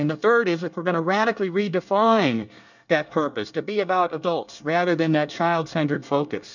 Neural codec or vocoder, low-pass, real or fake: codec, 24 kHz, 1 kbps, SNAC; 7.2 kHz; fake